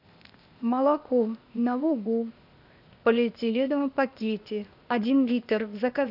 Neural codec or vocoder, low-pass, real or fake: codec, 16 kHz, 0.8 kbps, ZipCodec; 5.4 kHz; fake